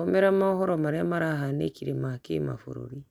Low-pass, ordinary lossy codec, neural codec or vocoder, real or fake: 19.8 kHz; none; none; real